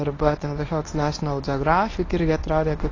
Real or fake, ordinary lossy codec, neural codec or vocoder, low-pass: fake; AAC, 32 kbps; codec, 24 kHz, 0.9 kbps, WavTokenizer, medium speech release version 1; 7.2 kHz